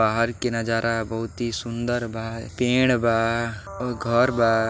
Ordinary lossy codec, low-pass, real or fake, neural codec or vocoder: none; none; real; none